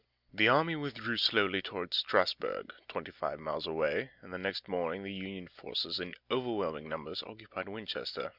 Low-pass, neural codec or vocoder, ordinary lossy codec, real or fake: 5.4 kHz; none; Opus, 64 kbps; real